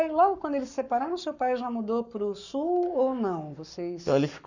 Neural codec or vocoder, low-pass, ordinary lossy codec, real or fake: codec, 44.1 kHz, 7.8 kbps, Pupu-Codec; 7.2 kHz; none; fake